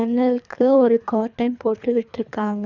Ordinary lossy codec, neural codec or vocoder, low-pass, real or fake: none; codec, 24 kHz, 3 kbps, HILCodec; 7.2 kHz; fake